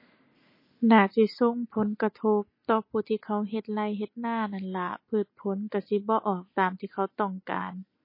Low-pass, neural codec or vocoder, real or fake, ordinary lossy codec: 5.4 kHz; none; real; MP3, 24 kbps